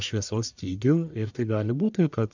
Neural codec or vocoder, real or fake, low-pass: codec, 44.1 kHz, 1.7 kbps, Pupu-Codec; fake; 7.2 kHz